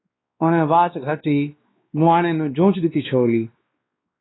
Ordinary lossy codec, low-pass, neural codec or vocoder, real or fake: AAC, 16 kbps; 7.2 kHz; codec, 16 kHz, 2 kbps, X-Codec, WavLM features, trained on Multilingual LibriSpeech; fake